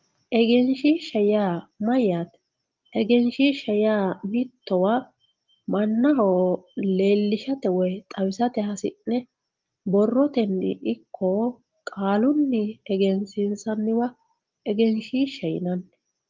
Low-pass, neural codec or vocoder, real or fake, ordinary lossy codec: 7.2 kHz; none; real; Opus, 32 kbps